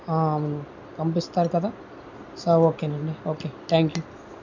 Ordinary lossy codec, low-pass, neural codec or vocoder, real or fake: none; 7.2 kHz; none; real